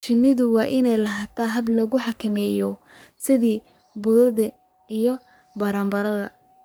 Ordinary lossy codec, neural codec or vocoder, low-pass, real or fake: none; codec, 44.1 kHz, 3.4 kbps, Pupu-Codec; none; fake